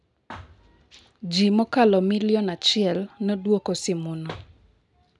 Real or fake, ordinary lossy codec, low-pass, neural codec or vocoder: real; none; 10.8 kHz; none